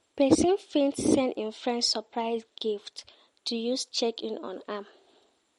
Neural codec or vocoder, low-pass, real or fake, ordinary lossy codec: none; 19.8 kHz; real; MP3, 48 kbps